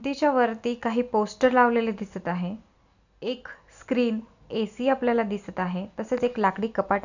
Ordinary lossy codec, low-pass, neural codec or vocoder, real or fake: none; 7.2 kHz; none; real